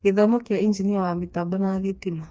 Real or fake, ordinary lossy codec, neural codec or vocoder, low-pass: fake; none; codec, 16 kHz, 2 kbps, FreqCodec, smaller model; none